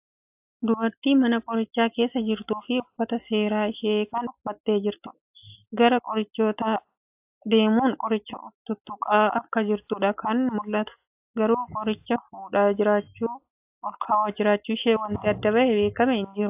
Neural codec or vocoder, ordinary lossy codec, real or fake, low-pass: none; AAC, 32 kbps; real; 3.6 kHz